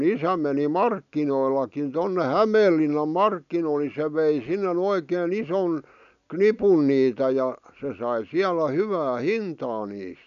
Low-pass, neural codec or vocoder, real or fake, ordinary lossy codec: 7.2 kHz; none; real; none